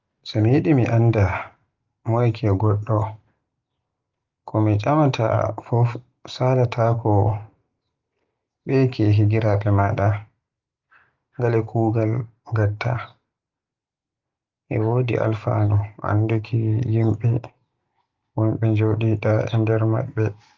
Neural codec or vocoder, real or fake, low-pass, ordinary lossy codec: none; real; 7.2 kHz; Opus, 32 kbps